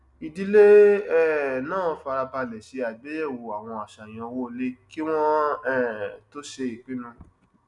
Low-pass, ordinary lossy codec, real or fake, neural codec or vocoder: 10.8 kHz; none; real; none